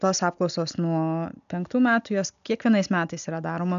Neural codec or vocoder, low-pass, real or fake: none; 7.2 kHz; real